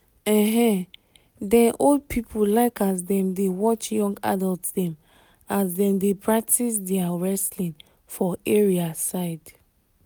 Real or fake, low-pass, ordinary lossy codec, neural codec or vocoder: real; none; none; none